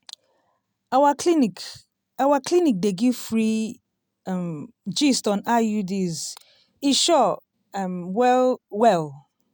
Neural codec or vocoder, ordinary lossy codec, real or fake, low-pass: none; none; real; none